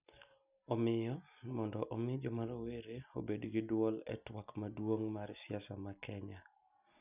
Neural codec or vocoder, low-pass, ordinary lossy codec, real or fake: none; 3.6 kHz; none; real